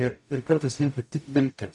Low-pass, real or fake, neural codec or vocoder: 10.8 kHz; fake; codec, 44.1 kHz, 0.9 kbps, DAC